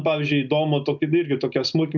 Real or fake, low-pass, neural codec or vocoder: real; 7.2 kHz; none